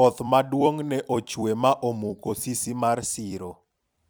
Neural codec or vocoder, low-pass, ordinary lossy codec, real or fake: vocoder, 44.1 kHz, 128 mel bands every 256 samples, BigVGAN v2; none; none; fake